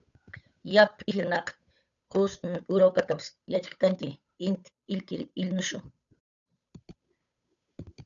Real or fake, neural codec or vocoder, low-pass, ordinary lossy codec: fake; codec, 16 kHz, 8 kbps, FunCodec, trained on Chinese and English, 25 frames a second; 7.2 kHz; MP3, 96 kbps